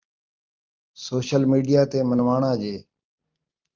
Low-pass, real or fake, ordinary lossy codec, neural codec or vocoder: 7.2 kHz; real; Opus, 32 kbps; none